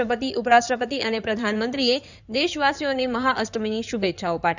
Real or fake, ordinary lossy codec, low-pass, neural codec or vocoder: fake; none; 7.2 kHz; codec, 16 kHz in and 24 kHz out, 2.2 kbps, FireRedTTS-2 codec